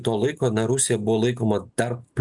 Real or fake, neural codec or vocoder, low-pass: real; none; 10.8 kHz